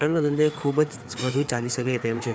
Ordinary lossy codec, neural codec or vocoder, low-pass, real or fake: none; codec, 16 kHz, 4 kbps, FreqCodec, larger model; none; fake